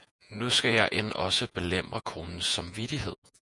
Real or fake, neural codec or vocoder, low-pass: fake; vocoder, 48 kHz, 128 mel bands, Vocos; 10.8 kHz